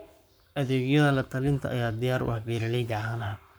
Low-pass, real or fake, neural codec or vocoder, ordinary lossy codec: none; fake; codec, 44.1 kHz, 7.8 kbps, Pupu-Codec; none